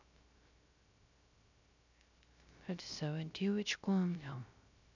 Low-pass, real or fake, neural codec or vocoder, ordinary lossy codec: 7.2 kHz; fake; codec, 16 kHz, 0.3 kbps, FocalCodec; none